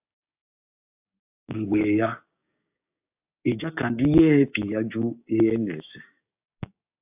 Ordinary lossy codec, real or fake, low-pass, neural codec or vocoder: AAC, 32 kbps; fake; 3.6 kHz; codec, 16 kHz, 6 kbps, DAC